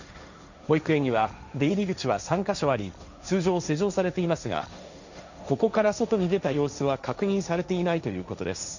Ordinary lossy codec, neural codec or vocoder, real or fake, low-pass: none; codec, 16 kHz, 1.1 kbps, Voila-Tokenizer; fake; 7.2 kHz